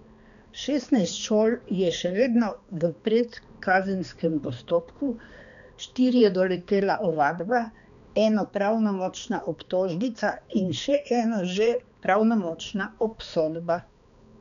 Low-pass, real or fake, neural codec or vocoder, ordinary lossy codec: 7.2 kHz; fake; codec, 16 kHz, 2 kbps, X-Codec, HuBERT features, trained on balanced general audio; none